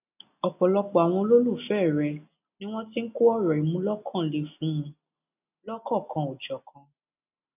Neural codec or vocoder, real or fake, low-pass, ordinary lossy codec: none; real; 3.6 kHz; none